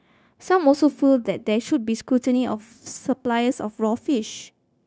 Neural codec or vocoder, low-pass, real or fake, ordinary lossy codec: codec, 16 kHz, 0.9 kbps, LongCat-Audio-Codec; none; fake; none